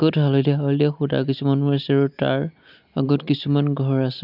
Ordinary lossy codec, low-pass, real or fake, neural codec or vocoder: none; 5.4 kHz; real; none